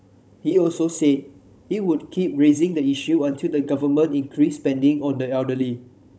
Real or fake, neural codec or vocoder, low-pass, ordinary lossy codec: fake; codec, 16 kHz, 16 kbps, FunCodec, trained on Chinese and English, 50 frames a second; none; none